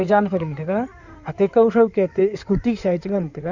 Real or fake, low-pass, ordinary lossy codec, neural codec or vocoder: fake; 7.2 kHz; none; codec, 16 kHz in and 24 kHz out, 2.2 kbps, FireRedTTS-2 codec